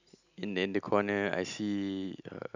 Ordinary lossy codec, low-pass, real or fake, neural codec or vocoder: none; 7.2 kHz; real; none